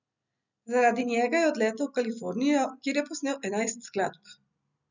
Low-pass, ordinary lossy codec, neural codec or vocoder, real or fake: 7.2 kHz; none; none; real